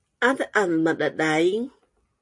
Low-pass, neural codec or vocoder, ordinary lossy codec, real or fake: 10.8 kHz; none; MP3, 48 kbps; real